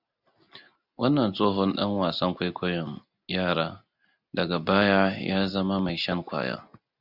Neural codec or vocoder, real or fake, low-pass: none; real; 5.4 kHz